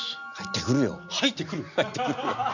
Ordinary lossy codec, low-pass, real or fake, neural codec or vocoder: none; 7.2 kHz; real; none